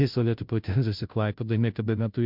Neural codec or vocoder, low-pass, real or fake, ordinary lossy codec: codec, 16 kHz, 0.5 kbps, FunCodec, trained on Chinese and English, 25 frames a second; 5.4 kHz; fake; MP3, 48 kbps